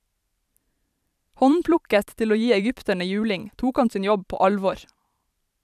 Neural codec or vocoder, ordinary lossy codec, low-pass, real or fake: vocoder, 44.1 kHz, 128 mel bands every 512 samples, BigVGAN v2; none; 14.4 kHz; fake